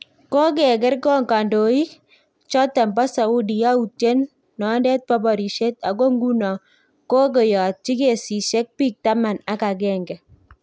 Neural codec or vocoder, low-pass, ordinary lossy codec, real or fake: none; none; none; real